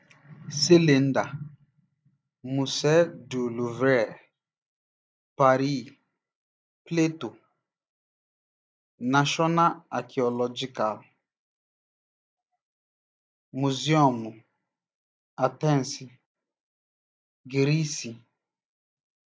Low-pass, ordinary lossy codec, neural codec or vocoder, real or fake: none; none; none; real